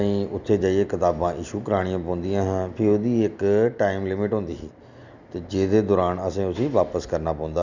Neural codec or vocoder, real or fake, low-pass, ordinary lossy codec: none; real; 7.2 kHz; none